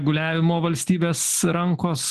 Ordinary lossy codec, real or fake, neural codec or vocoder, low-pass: Opus, 16 kbps; real; none; 14.4 kHz